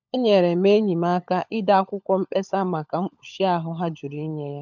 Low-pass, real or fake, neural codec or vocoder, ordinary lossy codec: 7.2 kHz; fake; codec, 16 kHz, 16 kbps, FunCodec, trained on LibriTTS, 50 frames a second; none